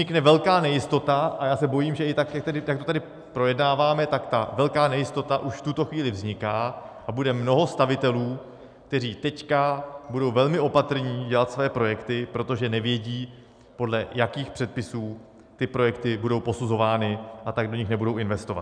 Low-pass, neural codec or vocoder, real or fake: 9.9 kHz; none; real